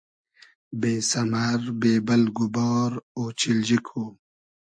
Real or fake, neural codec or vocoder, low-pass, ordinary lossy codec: real; none; 9.9 kHz; MP3, 64 kbps